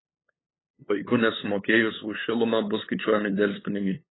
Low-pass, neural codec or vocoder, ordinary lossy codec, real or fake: 7.2 kHz; codec, 16 kHz, 8 kbps, FunCodec, trained on LibriTTS, 25 frames a second; AAC, 16 kbps; fake